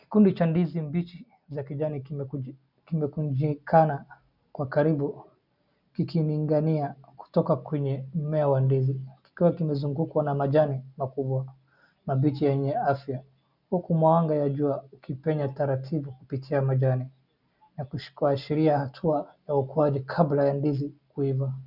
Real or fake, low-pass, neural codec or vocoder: real; 5.4 kHz; none